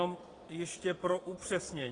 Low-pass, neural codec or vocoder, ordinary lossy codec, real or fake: 9.9 kHz; vocoder, 22.05 kHz, 80 mel bands, Vocos; AAC, 32 kbps; fake